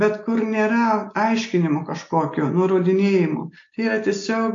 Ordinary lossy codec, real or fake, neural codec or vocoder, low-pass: AAC, 48 kbps; real; none; 7.2 kHz